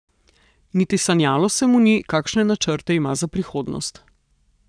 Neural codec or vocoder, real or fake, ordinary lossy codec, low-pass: codec, 44.1 kHz, 7.8 kbps, Pupu-Codec; fake; none; 9.9 kHz